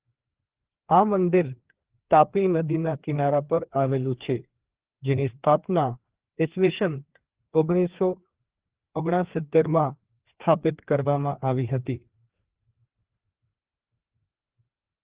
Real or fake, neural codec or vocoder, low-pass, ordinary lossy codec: fake; codec, 16 kHz, 2 kbps, FreqCodec, larger model; 3.6 kHz; Opus, 16 kbps